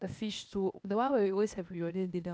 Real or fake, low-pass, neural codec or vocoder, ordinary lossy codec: fake; none; codec, 16 kHz, 0.8 kbps, ZipCodec; none